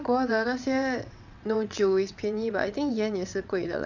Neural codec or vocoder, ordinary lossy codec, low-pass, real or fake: vocoder, 22.05 kHz, 80 mel bands, WaveNeXt; none; 7.2 kHz; fake